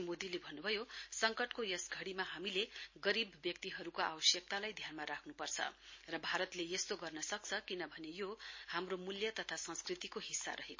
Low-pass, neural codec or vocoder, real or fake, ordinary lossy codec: 7.2 kHz; none; real; MP3, 32 kbps